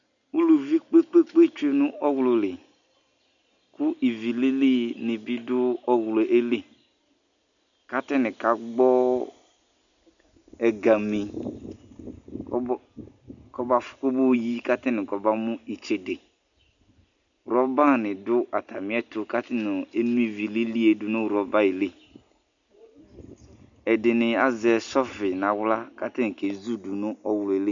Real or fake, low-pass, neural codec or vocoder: real; 7.2 kHz; none